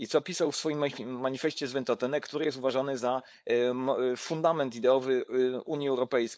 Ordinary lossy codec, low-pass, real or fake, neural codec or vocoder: none; none; fake; codec, 16 kHz, 4.8 kbps, FACodec